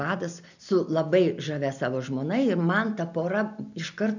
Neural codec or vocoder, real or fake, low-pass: none; real; 7.2 kHz